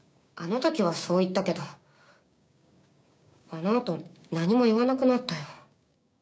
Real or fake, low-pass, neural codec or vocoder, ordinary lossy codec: fake; none; codec, 16 kHz, 6 kbps, DAC; none